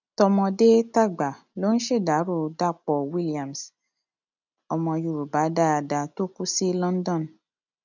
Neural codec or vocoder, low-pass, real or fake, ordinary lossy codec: none; 7.2 kHz; real; none